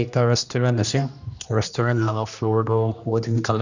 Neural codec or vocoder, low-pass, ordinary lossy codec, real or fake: codec, 16 kHz, 1 kbps, X-Codec, HuBERT features, trained on general audio; 7.2 kHz; none; fake